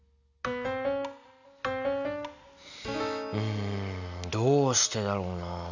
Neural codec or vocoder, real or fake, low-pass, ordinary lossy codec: none; real; 7.2 kHz; none